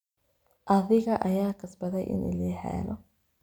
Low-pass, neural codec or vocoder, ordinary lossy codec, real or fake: none; none; none; real